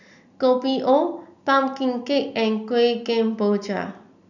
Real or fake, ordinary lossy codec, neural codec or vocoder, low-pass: real; none; none; 7.2 kHz